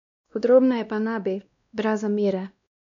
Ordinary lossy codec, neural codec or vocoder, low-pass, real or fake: MP3, 64 kbps; codec, 16 kHz, 1 kbps, X-Codec, WavLM features, trained on Multilingual LibriSpeech; 7.2 kHz; fake